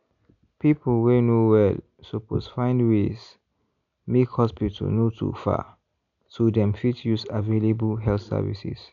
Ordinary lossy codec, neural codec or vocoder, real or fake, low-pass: none; none; real; 7.2 kHz